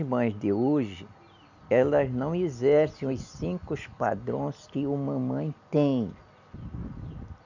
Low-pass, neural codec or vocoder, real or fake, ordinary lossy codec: 7.2 kHz; none; real; none